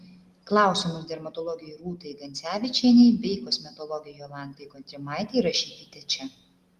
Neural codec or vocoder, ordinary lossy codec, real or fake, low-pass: none; Opus, 24 kbps; real; 14.4 kHz